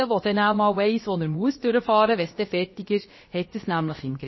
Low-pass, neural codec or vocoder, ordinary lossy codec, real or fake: 7.2 kHz; codec, 16 kHz, about 1 kbps, DyCAST, with the encoder's durations; MP3, 24 kbps; fake